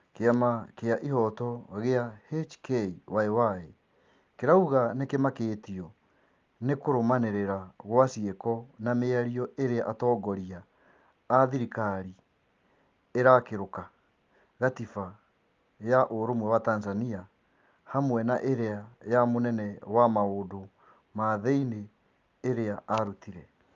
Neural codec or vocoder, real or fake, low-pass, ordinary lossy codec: none; real; 7.2 kHz; Opus, 32 kbps